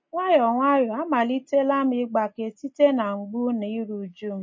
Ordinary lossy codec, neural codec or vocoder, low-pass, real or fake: MP3, 32 kbps; none; 7.2 kHz; real